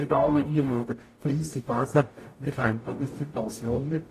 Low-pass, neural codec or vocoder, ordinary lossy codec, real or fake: 14.4 kHz; codec, 44.1 kHz, 0.9 kbps, DAC; AAC, 48 kbps; fake